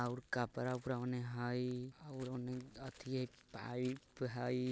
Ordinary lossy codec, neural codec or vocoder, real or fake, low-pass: none; none; real; none